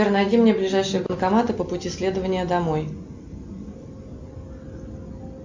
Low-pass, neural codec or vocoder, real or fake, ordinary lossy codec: 7.2 kHz; none; real; MP3, 48 kbps